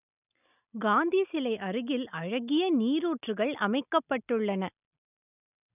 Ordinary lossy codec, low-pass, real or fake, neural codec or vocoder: none; 3.6 kHz; real; none